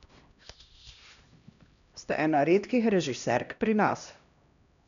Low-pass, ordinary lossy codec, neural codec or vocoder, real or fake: 7.2 kHz; none; codec, 16 kHz, 1 kbps, X-Codec, HuBERT features, trained on LibriSpeech; fake